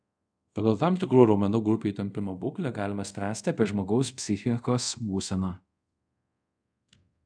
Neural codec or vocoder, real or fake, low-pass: codec, 24 kHz, 0.5 kbps, DualCodec; fake; 9.9 kHz